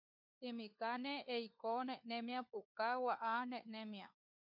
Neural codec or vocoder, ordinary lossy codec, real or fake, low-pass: codec, 16 kHz, 4 kbps, FunCodec, trained on LibriTTS, 50 frames a second; MP3, 48 kbps; fake; 5.4 kHz